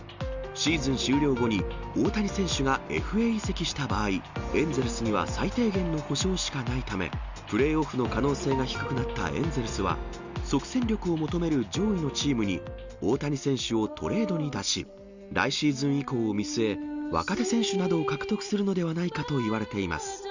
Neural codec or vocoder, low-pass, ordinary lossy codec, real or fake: none; 7.2 kHz; Opus, 64 kbps; real